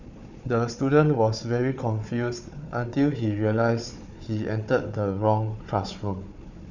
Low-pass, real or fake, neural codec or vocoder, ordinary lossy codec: 7.2 kHz; fake; codec, 16 kHz, 4 kbps, FunCodec, trained on Chinese and English, 50 frames a second; none